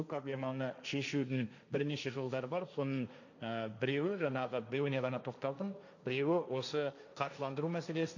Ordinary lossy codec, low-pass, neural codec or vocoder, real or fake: none; 7.2 kHz; codec, 16 kHz, 1.1 kbps, Voila-Tokenizer; fake